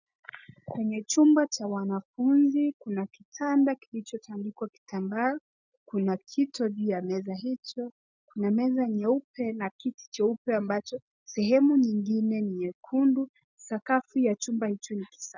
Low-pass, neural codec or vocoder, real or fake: 7.2 kHz; none; real